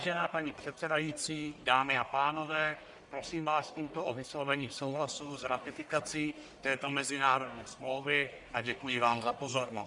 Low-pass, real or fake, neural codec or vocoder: 10.8 kHz; fake; codec, 44.1 kHz, 1.7 kbps, Pupu-Codec